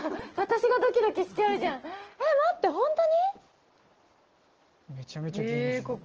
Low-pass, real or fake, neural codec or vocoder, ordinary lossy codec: 7.2 kHz; real; none; Opus, 16 kbps